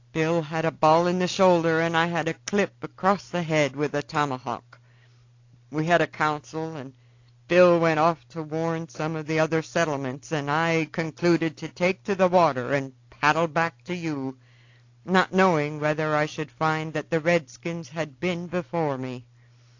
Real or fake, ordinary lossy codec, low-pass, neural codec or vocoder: real; AAC, 48 kbps; 7.2 kHz; none